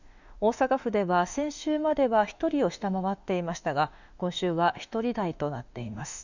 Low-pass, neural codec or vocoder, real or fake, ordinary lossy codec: 7.2 kHz; autoencoder, 48 kHz, 32 numbers a frame, DAC-VAE, trained on Japanese speech; fake; none